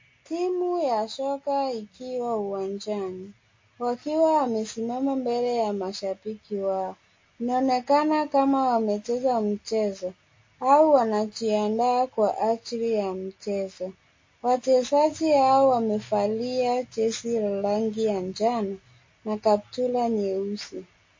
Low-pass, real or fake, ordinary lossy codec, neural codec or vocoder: 7.2 kHz; real; MP3, 32 kbps; none